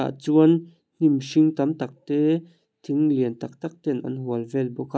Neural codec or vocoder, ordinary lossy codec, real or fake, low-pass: none; none; real; none